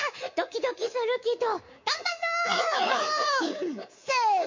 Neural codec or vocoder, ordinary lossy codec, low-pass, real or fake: codec, 24 kHz, 3.1 kbps, DualCodec; MP3, 48 kbps; 7.2 kHz; fake